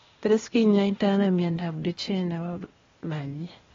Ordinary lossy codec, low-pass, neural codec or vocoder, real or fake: AAC, 24 kbps; 7.2 kHz; codec, 16 kHz, 0.8 kbps, ZipCodec; fake